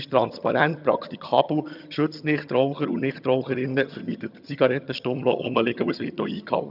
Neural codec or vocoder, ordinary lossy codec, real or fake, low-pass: vocoder, 22.05 kHz, 80 mel bands, HiFi-GAN; none; fake; 5.4 kHz